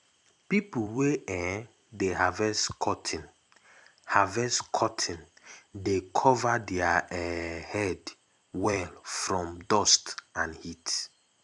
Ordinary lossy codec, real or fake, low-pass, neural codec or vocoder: none; fake; 10.8 kHz; vocoder, 44.1 kHz, 128 mel bands every 256 samples, BigVGAN v2